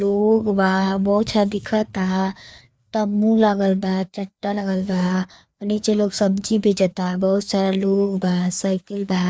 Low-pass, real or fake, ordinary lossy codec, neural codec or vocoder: none; fake; none; codec, 16 kHz, 2 kbps, FreqCodec, larger model